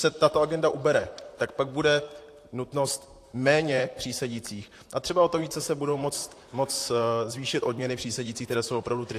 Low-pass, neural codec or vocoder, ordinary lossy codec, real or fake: 14.4 kHz; vocoder, 44.1 kHz, 128 mel bands, Pupu-Vocoder; AAC, 64 kbps; fake